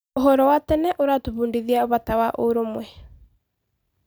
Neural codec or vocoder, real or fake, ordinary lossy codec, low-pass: none; real; none; none